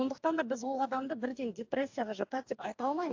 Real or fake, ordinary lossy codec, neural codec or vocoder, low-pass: fake; AAC, 48 kbps; codec, 44.1 kHz, 2.6 kbps, DAC; 7.2 kHz